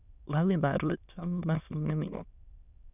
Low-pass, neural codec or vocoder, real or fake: 3.6 kHz; autoencoder, 22.05 kHz, a latent of 192 numbers a frame, VITS, trained on many speakers; fake